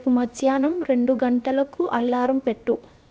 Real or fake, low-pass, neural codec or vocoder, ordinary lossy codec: fake; none; codec, 16 kHz, 0.7 kbps, FocalCodec; none